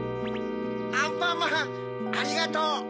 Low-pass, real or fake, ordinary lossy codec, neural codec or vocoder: none; real; none; none